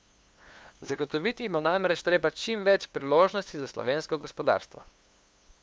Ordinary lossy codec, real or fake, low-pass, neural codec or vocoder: none; fake; none; codec, 16 kHz, 2 kbps, FunCodec, trained on LibriTTS, 25 frames a second